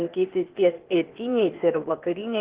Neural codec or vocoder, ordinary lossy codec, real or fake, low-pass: codec, 16 kHz, 0.8 kbps, ZipCodec; Opus, 16 kbps; fake; 3.6 kHz